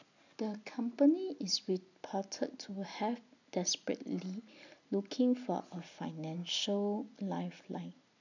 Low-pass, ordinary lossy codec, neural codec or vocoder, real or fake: 7.2 kHz; none; none; real